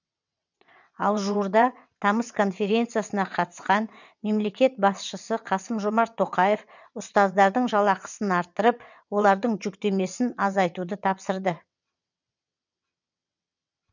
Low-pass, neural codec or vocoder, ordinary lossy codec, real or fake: 7.2 kHz; vocoder, 22.05 kHz, 80 mel bands, WaveNeXt; none; fake